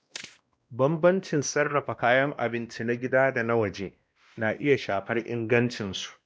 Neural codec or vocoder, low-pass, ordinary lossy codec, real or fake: codec, 16 kHz, 1 kbps, X-Codec, WavLM features, trained on Multilingual LibriSpeech; none; none; fake